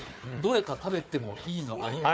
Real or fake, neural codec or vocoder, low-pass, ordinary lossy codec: fake; codec, 16 kHz, 4 kbps, FunCodec, trained on Chinese and English, 50 frames a second; none; none